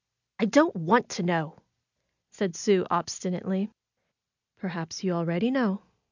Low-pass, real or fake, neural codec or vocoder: 7.2 kHz; real; none